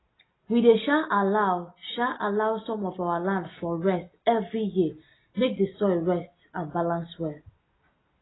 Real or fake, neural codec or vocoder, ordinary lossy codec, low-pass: real; none; AAC, 16 kbps; 7.2 kHz